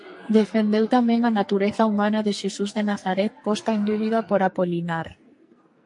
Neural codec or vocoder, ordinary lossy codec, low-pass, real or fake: codec, 44.1 kHz, 2.6 kbps, SNAC; MP3, 48 kbps; 10.8 kHz; fake